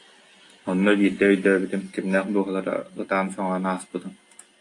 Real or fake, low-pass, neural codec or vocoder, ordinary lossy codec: real; 10.8 kHz; none; AAC, 48 kbps